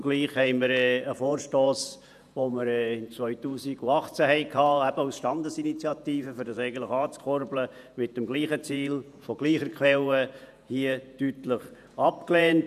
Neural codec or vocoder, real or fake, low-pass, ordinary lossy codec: vocoder, 48 kHz, 128 mel bands, Vocos; fake; 14.4 kHz; none